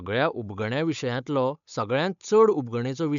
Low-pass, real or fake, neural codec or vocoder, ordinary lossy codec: 7.2 kHz; fake; codec, 16 kHz, 8 kbps, FunCodec, trained on Chinese and English, 25 frames a second; none